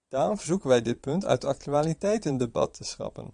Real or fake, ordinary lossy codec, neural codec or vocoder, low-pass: fake; Opus, 64 kbps; vocoder, 22.05 kHz, 80 mel bands, Vocos; 9.9 kHz